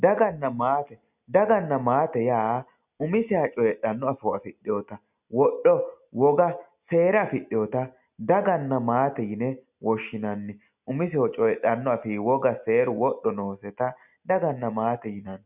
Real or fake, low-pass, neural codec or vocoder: real; 3.6 kHz; none